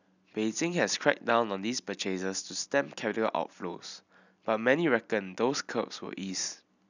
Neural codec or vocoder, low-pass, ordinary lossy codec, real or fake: none; 7.2 kHz; none; real